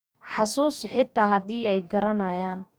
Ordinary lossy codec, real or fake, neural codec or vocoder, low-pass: none; fake; codec, 44.1 kHz, 2.6 kbps, DAC; none